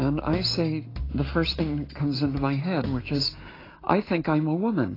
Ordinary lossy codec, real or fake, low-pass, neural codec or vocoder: AAC, 24 kbps; real; 5.4 kHz; none